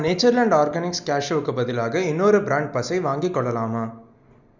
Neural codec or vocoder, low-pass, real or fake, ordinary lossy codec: none; 7.2 kHz; real; none